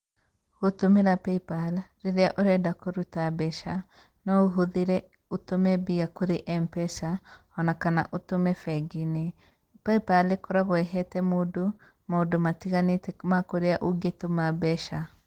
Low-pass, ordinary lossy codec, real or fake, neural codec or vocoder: 19.8 kHz; Opus, 16 kbps; real; none